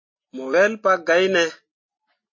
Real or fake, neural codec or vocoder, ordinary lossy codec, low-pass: real; none; MP3, 32 kbps; 7.2 kHz